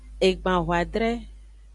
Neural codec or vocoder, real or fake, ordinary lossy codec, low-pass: none; real; Opus, 64 kbps; 10.8 kHz